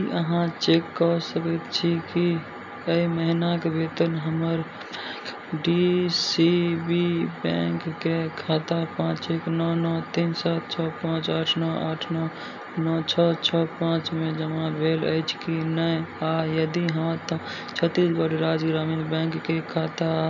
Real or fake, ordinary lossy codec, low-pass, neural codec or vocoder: real; none; 7.2 kHz; none